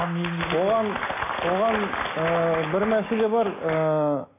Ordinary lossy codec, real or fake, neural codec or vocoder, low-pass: AAC, 16 kbps; real; none; 3.6 kHz